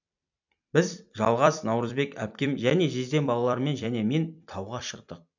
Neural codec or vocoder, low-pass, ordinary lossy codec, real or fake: vocoder, 44.1 kHz, 128 mel bands every 256 samples, BigVGAN v2; 7.2 kHz; none; fake